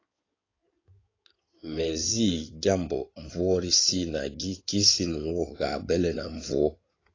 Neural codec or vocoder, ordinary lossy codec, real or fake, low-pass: codec, 16 kHz in and 24 kHz out, 2.2 kbps, FireRedTTS-2 codec; AAC, 32 kbps; fake; 7.2 kHz